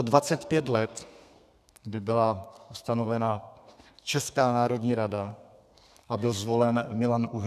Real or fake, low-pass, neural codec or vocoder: fake; 14.4 kHz; codec, 32 kHz, 1.9 kbps, SNAC